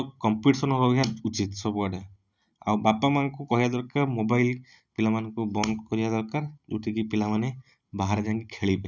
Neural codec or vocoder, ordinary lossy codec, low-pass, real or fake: none; none; 7.2 kHz; real